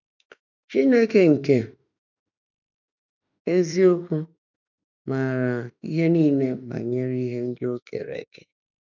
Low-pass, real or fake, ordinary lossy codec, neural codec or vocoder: 7.2 kHz; fake; none; autoencoder, 48 kHz, 32 numbers a frame, DAC-VAE, trained on Japanese speech